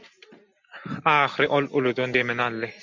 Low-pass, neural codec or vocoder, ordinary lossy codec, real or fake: 7.2 kHz; none; MP3, 64 kbps; real